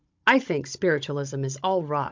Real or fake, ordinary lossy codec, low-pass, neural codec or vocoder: fake; MP3, 64 kbps; 7.2 kHz; codec, 16 kHz, 16 kbps, FunCodec, trained on Chinese and English, 50 frames a second